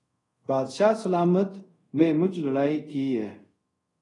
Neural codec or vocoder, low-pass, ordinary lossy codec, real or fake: codec, 24 kHz, 0.5 kbps, DualCodec; 10.8 kHz; AAC, 32 kbps; fake